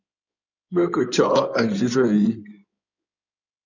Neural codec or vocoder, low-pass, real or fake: codec, 16 kHz in and 24 kHz out, 2.2 kbps, FireRedTTS-2 codec; 7.2 kHz; fake